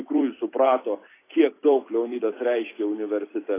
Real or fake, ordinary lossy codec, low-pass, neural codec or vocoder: fake; AAC, 16 kbps; 3.6 kHz; vocoder, 44.1 kHz, 128 mel bands every 256 samples, BigVGAN v2